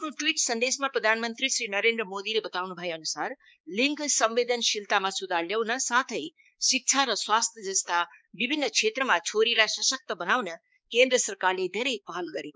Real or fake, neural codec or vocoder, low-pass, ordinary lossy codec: fake; codec, 16 kHz, 4 kbps, X-Codec, HuBERT features, trained on balanced general audio; none; none